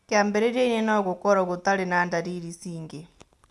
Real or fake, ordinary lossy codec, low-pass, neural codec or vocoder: real; none; none; none